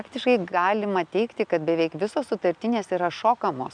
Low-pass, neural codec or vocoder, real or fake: 9.9 kHz; none; real